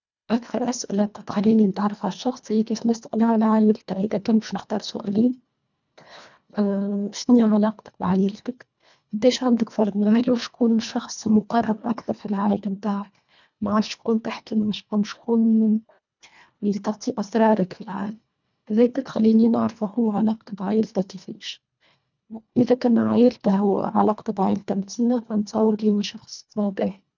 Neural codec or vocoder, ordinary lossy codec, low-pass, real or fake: codec, 24 kHz, 1.5 kbps, HILCodec; none; 7.2 kHz; fake